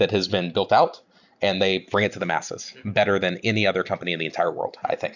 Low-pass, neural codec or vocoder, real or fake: 7.2 kHz; none; real